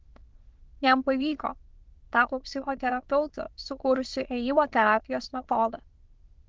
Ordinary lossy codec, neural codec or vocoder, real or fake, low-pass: Opus, 16 kbps; autoencoder, 22.05 kHz, a latent of 192 numbers a frame, VITS, trained on many speakers; fake; 7.2 kHz